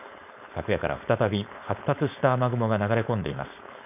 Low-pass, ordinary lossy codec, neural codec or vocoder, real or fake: 3.6 kHz; none; codec, 16 kHz, 4.8 kbps, FACodec; fake